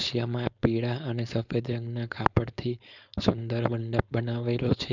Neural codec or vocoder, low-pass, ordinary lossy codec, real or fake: codec, 16 kHz, 4.8 kbps, FACodec; 7.2 kHz; none; fake